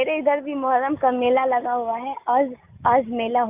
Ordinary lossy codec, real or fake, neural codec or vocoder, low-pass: Opus, 64 kbps; real; none; 3.6 kHz